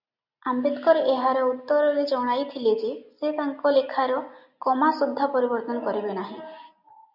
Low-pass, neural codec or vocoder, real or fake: 5.4 kHz; none; real